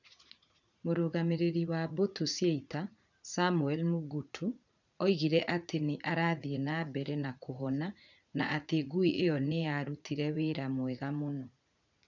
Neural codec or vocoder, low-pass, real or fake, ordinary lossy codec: vocoder, 44.1 kHz, 80 mel bands, Vocos; 7.2 kHz; fake; none